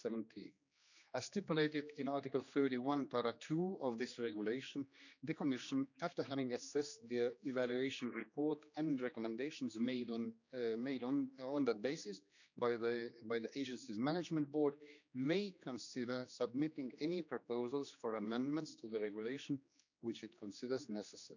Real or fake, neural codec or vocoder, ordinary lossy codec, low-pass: fake; codec, 16 kHz, 2 kbps, X-Codec, HuBERT features, trained on general audio; none; 7.2 kHz